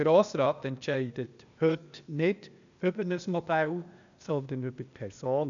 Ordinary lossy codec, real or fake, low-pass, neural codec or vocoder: none; fake; 7.2 kHz; codec, 16 kHz, 0.8 kbps, ZipCodec